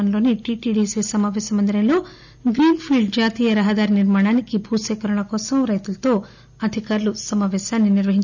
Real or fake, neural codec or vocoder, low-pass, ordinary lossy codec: real; none; 7.2 kHz; none